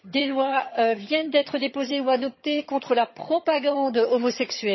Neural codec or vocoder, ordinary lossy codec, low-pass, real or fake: vocoder, 22.05 kHz, 80 mel bands, HiFi-GAN; MP3, 24 kbps; 7.2 kHz; fake